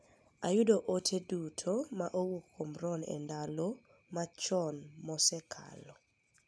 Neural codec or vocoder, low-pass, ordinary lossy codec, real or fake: none; none; none; real